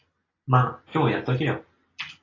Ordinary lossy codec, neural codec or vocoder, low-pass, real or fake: AAC, 32 kbps; none; 7.2 kHz; real